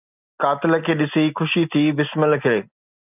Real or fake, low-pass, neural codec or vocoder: real; 3.6 kHz; none